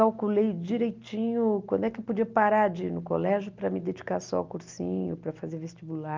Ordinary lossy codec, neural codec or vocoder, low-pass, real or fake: Opus, 24 kbps; none; 7.2 kHz; real